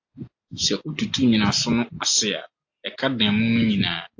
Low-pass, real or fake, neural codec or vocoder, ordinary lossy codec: 7.2 kHz; real; none; AAC, 48 kbps